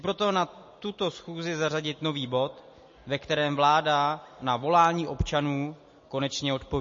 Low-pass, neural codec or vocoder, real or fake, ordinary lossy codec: 7.2 kHz; none; real; MP3, 32 kbps